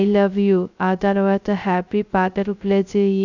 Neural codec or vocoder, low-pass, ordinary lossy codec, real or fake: codec, 16 kHz, 0.2 kbps, FocalCodec; 7.2 kHz; none; fake